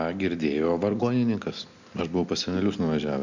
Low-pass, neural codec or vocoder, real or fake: 7.2 kHz; none; real